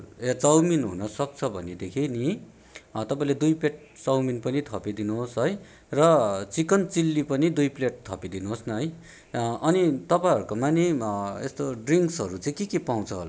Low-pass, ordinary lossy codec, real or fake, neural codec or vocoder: none; none; real; none